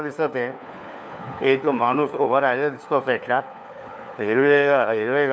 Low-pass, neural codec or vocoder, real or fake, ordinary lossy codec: none; codec, 16 kHz, 4 kbps, FunCodec, trained on LibriTTS, 50 frames a second; fake; none